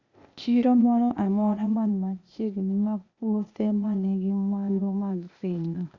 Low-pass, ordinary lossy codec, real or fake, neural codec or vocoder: 7.2 kHz; Opus, 64 kbps; fake; codec, 16 kHz, 0.8 kbps, ZipCodec